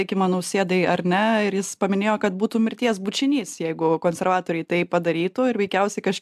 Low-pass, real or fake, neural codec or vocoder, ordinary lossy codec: 14.4 kHz; real; none; AAC, 96 kbps